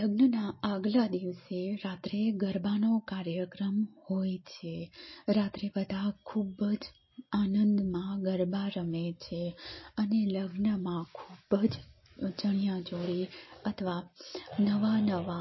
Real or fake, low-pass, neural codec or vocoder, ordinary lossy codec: real; 7.2 kHz; none; MP3, 24 kbps